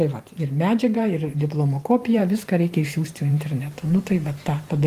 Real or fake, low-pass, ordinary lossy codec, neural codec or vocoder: fake; 14.4 kHz; Opus, 32 kbps; vocoder, 44.1 kHz, 128 mel bands every 512 samples, BigVGAN v2